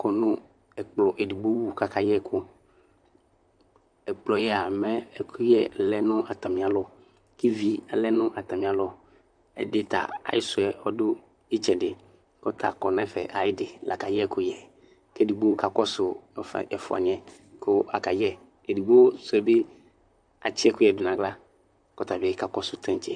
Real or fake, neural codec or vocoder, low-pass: fake; vocoder, 44.1 kHz, 128 mel bands, Pupu-Vocoder; 9.9 kHz